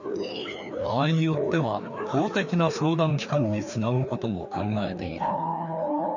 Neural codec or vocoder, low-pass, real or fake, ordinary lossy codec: codec, 16 kHz, 2 kbps, FreqCodec, larger model; 7.2 kHz; fake; none